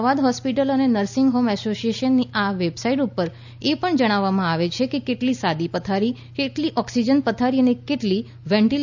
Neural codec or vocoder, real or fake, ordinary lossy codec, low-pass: none; real; none; 7.2 kHz